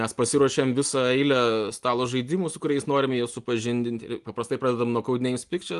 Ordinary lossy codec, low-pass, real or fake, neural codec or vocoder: Opus, 32 kbps; 10.8 kHz; real; none